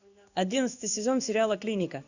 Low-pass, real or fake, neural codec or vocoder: 7.2 kHz; fake; codec, 16 kHz in and 24 kHz out, 1 kbps, XY-Tokenizer